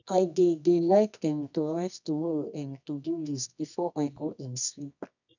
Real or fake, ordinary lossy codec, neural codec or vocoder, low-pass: fake; none; codec, 24 kHz, 0.9 kbps, WavTokenizer, medium music audio release; 7.2 kHz